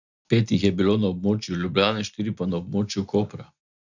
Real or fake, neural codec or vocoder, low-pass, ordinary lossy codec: real; none; 7.2 kHz; none